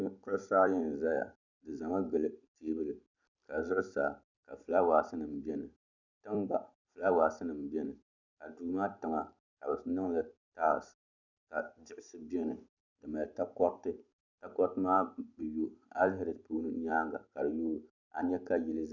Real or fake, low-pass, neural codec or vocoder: fake; 7.2 kHz; vocoder, 44.1 kHz, 128 mel bands every 256 samples, BigVGAN v2